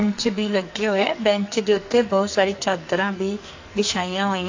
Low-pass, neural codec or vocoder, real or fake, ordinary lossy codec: 7.2 kHz; codec, 44.1 kHz, 2.6 kbps, SNAC; fake; AAC, 48 kbps